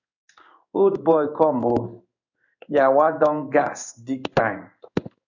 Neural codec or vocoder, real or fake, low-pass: codec, 16 kHz in and 24 kHz out, 1 kbps, XY-Tokenizer; fake; 7.2 kHz